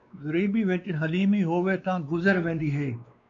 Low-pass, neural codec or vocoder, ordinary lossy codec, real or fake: 7.2 kHz; codec, 16 kHz, 2 kbps, X-Codec, WavLM features, trained on Multilingual LibriSpeech; MP3, 64 kbps; fake